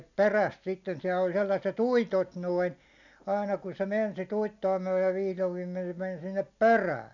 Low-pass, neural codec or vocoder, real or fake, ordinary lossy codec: 7.2 kHz; none; real; none